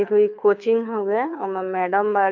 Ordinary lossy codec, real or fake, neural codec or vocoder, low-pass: MP3, 48 kbps; fake; codec, 24 kHz, 6 kbps, HILCodec; 7.2 kHz